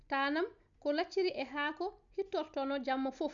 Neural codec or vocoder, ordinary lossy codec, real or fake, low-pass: none; none; real; 7.2 kHz